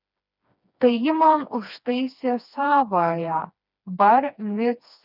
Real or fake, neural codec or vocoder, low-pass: fake; codec, 16 kHz, 2 kbps, FreqCodec, smaller model; 5.4 kHz